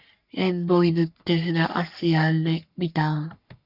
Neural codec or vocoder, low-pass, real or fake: codec, 44.1 kHz, 3.4 kbps, Pupu-Codec; 5.4 kHz; fake